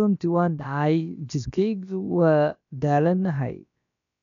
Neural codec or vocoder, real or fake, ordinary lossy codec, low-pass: codec, 16 kHz, about 1 kbps, DyCAST, with the encoder's durations; fake; none; 7.2 kHz